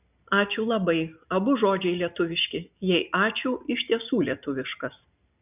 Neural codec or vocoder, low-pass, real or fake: none; 3.6 kHz; real